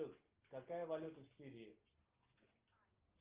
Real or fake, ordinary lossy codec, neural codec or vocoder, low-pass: real; Opus, 32 kbps; none; 3.6 kHz